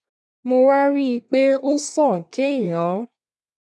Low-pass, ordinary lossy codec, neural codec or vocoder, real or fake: none; none; codec, 24 kHz, 1 kbps, SNAC; fake